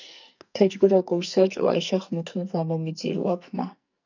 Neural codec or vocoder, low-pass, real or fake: codec, 44.1 kHz, 2.6 kbps, SNAC; 7.2 kHz; fake